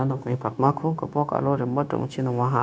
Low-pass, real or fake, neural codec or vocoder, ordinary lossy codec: none; fake; codec, 16 kHz, 0.9 kbps, LongCat-Audio-Codec; none